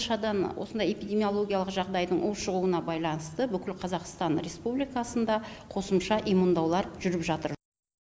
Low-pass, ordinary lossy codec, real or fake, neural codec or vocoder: none; none; real; none